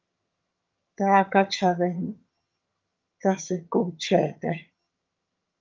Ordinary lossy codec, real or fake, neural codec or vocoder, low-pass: Opus, 24 kbps; fake; vocoder, 22.05 kHz, 80 mel bands, HiFi-GAN; 7.2 kHz